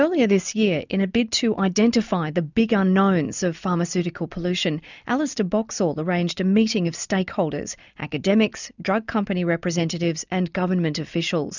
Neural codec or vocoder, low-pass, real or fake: none; 7.2 kHz; real